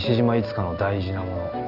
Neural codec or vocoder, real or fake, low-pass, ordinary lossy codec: none; real; 5.4 kHz; none